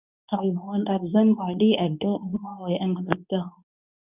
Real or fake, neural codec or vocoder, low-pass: fake; codec, 24 kHz, 0.9 kbps, WavTokenizer, medium speech release version 2; 3.6 kHz